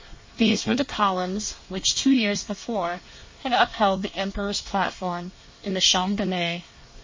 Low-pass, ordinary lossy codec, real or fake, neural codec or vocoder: 7.2 kHz; MP3, 32 kbps; fake; codec, 24 kHz, 1 kbps, SNAC